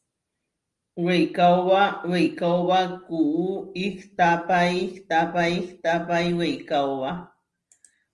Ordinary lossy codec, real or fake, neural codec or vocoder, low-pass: Opus, 24 kbps; real; none; 10.8 kHz